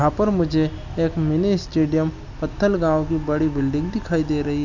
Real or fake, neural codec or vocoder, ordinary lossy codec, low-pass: real; none; none; 7.2 kHz